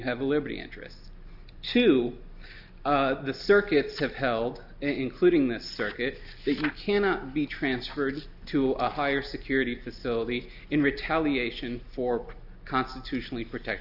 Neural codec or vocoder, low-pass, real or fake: none; 5.4 kHz; real